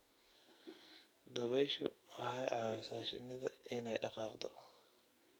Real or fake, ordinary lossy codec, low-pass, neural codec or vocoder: fake; none; none; codec, 44.1 kHz, 2.6 kbps, SNAC